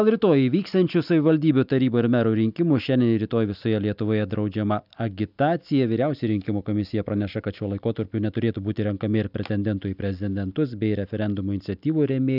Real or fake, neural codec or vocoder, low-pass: real; none; 5.4 kHz